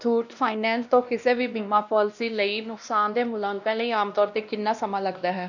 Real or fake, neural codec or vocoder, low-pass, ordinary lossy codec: fake; codec, 16 kHz, 1 kbps, X-Codec, WavLM features, trained on Multilingual LibriSpeech; 7.2 kHz; none